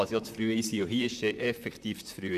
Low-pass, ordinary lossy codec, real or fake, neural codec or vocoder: 14.4 kHz; none; fake; vocoder, 44.1 kHz, 128 mel bands, Pupu-Vocoder